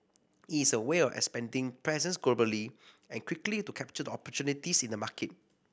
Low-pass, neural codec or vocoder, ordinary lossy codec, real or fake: none; none; none; real